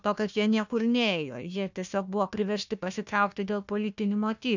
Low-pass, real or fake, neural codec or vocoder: 7.2 kHz; fake; codec, 16 kHz, 1 kbps, FunCodec, trained on Chinese and English, 50 frames a second